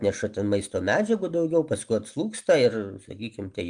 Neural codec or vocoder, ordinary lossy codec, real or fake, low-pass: none; Opus, 32 kbps; real; 9.9 kHz